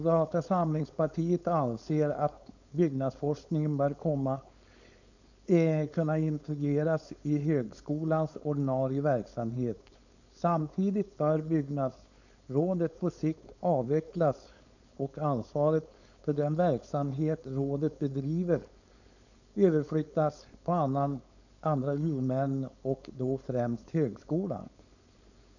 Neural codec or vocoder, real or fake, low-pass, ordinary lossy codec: codec, 16 kHz, 4.8 kbps, FACodec; fake; 7.2 kHz; none